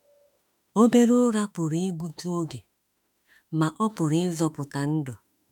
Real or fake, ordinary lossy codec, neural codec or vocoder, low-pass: fake; none; autoencoder, 48 kHz, 32 numbers a frame, DAC-VAE, trained on Japanese speech; 19.8 kHz